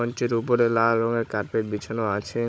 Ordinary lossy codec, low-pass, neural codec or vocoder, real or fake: none; none; codec, 16 kHz, 16 kbps, FunCodec, trained on Chinese and English, 50 frames a second; fake